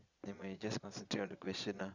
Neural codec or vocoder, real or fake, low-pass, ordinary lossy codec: vocoder, 22.05 kHz, 80 mel bands, Vocos; fake; 7.2 kHz; Opus, 64 kbps